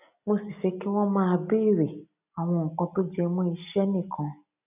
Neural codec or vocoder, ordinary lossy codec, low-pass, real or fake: none; none; 3.6 kHz; real